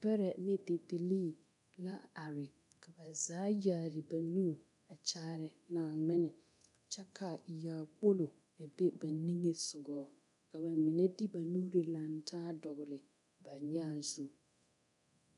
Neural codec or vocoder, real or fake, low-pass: codec, 24 kHz, 0.9 kbps, DualCodec; fake; 10.8 kHz